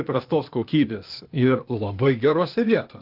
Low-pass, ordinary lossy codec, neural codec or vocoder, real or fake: 5.4 kHz; Opus, 32 kbps; codec, 16 kHz, 0.8 kbps, ZipCodec; fake